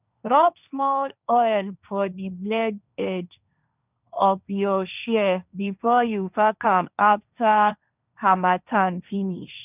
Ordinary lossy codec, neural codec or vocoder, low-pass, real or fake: none; codec, 16 kHz, 1.1 kbps, Voila-Tokenizer; 3.6 kHz; fake